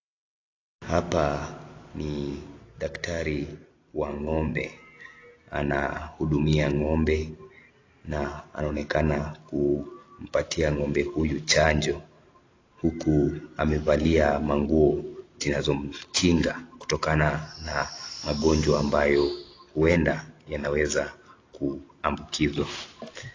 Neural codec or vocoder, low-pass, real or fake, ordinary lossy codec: none; 7.2 kHz; real; AAC, 32 kbps